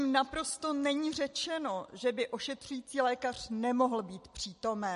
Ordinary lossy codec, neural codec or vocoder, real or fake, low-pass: MP3, 48 kbps; none; real; 10.8 kHz